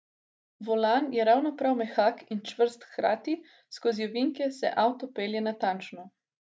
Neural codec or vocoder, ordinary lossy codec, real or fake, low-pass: none; none; real; none